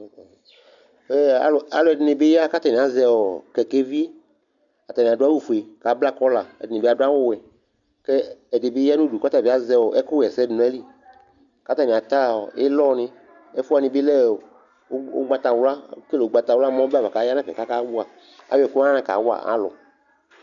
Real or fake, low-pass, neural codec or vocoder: real; 7.2 kHz; none